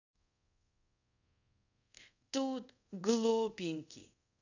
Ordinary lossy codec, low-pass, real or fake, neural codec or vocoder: none; 7.2 kHz; fake; codec, 24 kHz, 0.5 kbps, DualCodec